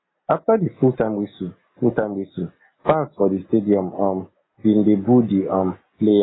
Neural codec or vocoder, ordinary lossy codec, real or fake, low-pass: none; AAC, 16 kbps; real; 7.2 kHz